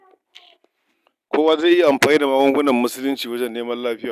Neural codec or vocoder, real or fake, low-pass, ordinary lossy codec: none; real; 14.4 kHz; none